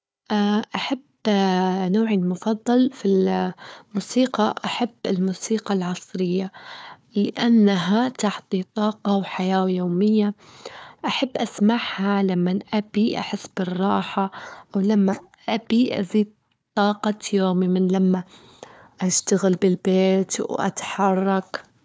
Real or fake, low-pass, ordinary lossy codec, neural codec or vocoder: fake; none; none; codec, 16 kHz, 4 kbps, FunCodec, trained on Chinese and English, 50 frames a second